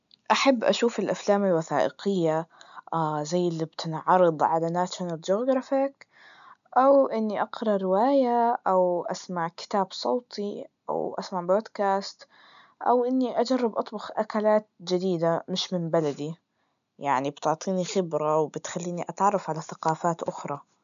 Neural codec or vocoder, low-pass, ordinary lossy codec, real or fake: none; 7.2 kHz; none; real